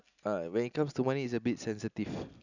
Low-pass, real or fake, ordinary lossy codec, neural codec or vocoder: 7.2 kHz; real; none; none